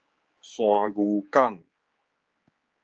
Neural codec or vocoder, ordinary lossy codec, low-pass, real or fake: codec, 16 kHz, 4 kbps, X-Codec, HuBERT features, trained on general audio; Opus, 24 kbps; 7.2 kHz; fake